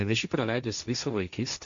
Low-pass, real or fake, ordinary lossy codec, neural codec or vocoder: 7.2 kHz; fake; Opus, 64 kbps; codec, 16 kHz, 1.1 kbps, Voila-Tokenizer